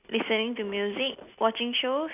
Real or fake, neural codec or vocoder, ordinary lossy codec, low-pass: real; none; none; 3.6 kHz